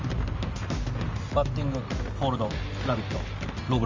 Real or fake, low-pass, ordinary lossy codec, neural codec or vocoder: real; 7.2 kHz; Opus, 32 kbps; none